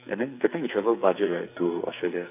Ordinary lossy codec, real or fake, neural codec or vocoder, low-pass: none; fake; codec, 44.1 kHz, 2.6 kbps, SNAC; 3.6 kHz